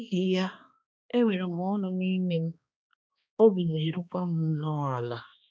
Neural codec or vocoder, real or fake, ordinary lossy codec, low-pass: codec, 16 kHz, 2 kbps, X-Codec, HuBERT features, trained on balanced general audio; fake; none; none